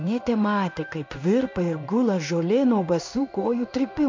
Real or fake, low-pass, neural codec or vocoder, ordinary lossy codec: fake; 7.2 kHz; codec, 16 kHz in and 24 kHz out, 1 kbps, XY-Tokenizer; MP3, 48 kbps